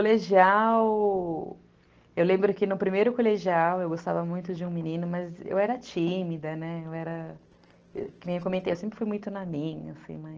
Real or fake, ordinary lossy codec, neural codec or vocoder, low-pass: real; Opus, 16 kbps; none; 7.2 kHz